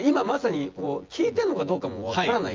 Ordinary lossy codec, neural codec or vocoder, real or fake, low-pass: Opus, 32 kbps; vocoder, 24 kHz, 100 mel bands, Vocos; fake; 7.2 kHz